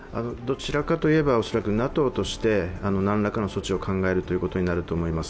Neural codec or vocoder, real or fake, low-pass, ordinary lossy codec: none; real; none; none